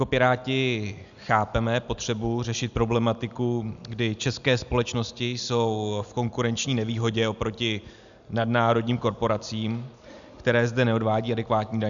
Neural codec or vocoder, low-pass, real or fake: none; 7.2 kHz; real